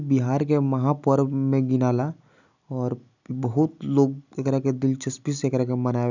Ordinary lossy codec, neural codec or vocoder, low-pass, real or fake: none; none; 7.2 kHz; real